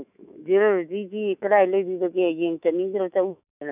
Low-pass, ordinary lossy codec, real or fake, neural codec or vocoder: 3.6 kHz; none; fake; autoencoder, 48 kHz, 128 numbers a frame, DAC-VAE, trained on Japanese speech